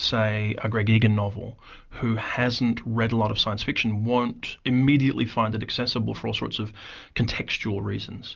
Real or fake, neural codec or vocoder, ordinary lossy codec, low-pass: real; none; Opus, 24 kbps; 7.2 kHz